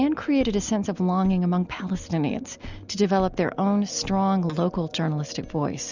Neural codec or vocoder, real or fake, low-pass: none; real; 7.2 kHz